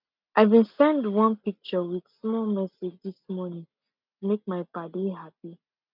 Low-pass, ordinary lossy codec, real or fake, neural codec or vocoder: 5.4 kHz; none; real; none